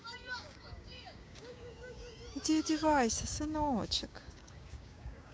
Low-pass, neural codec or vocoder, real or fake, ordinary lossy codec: none; codec, 16 kHz, 6 kbps, DAC; fake; none